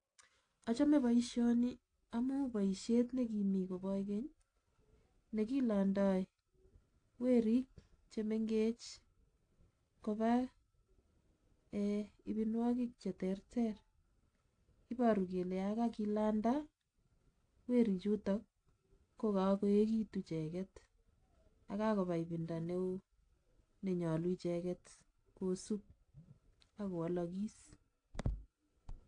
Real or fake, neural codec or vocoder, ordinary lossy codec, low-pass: real; none; AAC, 48 kbps; 9.9 kHz